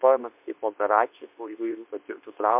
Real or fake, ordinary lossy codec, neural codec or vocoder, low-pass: fake; MP3, 32 kbps; codec, 24 kHz, 0.9 kbps, WavTokenizer, medium speech release version 2; 3.6 kHz